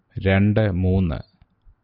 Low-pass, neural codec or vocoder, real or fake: 5.4 kHz; none; real